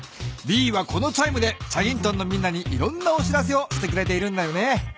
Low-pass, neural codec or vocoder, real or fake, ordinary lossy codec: none; none; real; none